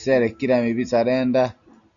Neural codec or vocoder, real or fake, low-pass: none; real; 7.2 kHz